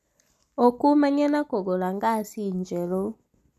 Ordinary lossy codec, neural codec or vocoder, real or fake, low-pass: none; none; real; 14.4 kHz